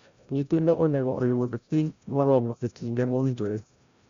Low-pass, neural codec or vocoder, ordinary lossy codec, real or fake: 7.2 kHz; codec, 16 kHz, 0.5 kbps, FreqCodec, larger model; Opus, 64 kbps; fake